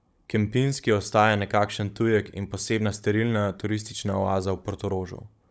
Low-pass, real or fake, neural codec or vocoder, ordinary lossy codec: none; fake; codec, 16 kHz, 16 kbps, FunCodec, trained on Chinese and English, 50 frames a second; none